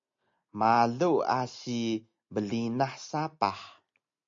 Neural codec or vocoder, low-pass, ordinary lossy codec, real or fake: none; 7.2 kHz; MP3, 48 kbps; real